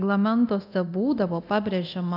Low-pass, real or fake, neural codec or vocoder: 5.4 kHz; fake; codec, 24 kHz, 0.9 kbps, DualCodec